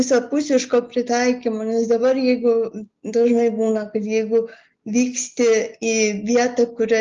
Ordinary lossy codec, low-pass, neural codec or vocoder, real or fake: Opus, 32 kbps; 7.2 kHz; none; real